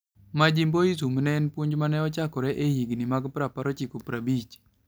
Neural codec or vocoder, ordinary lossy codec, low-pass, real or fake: none; none; none; real